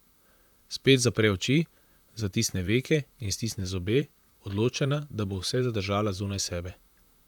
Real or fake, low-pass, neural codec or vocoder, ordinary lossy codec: fake; 19.8 kHz; vocoder, 44.1 kHz, 128 mel bands, Pupu-Vocoder; none